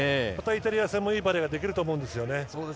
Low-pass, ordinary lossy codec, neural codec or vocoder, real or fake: none; none; none; real